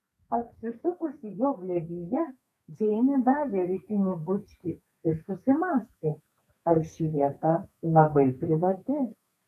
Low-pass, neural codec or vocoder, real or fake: 14.4 kHz; codec, 32 kHz, 1.9 kbps, SNAC; fake